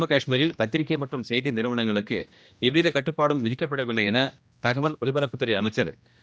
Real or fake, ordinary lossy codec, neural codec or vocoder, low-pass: fake; none; codec, 16 kHz, 1 kbps, X-Codec, HuBERT features, trained on general audio; none